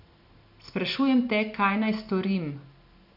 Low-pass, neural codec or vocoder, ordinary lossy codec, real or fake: 5.4 kHz; none; none; real